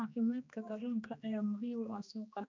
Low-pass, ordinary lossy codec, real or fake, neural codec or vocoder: 7.2 kHz; MP3, 64 kbps; fake; codec, 16 kHz, 2 kbps, X-Codec, HuBERT features, trained on general audio